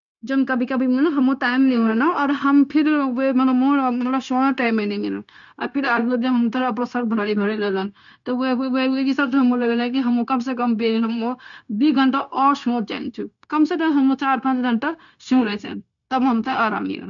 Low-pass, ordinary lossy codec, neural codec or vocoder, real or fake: 7.2 kHz; none; codec, 16 kHz, 0.9 kbps, LongCat-Audio-Codec; fake